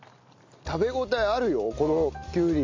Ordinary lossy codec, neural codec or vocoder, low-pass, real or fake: MP3, 64 kbps; none; 7.2 kHz; real